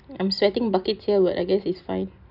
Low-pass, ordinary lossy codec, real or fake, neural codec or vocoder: 5.4 kHz; none; real; none